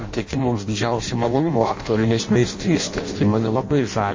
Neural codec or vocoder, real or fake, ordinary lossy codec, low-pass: codec, 16 kHz in and 24 kHz out, 0.6 kbps, FireRedTTS-2 codec; fake; MP3, 32 kbps; 7.2 kHz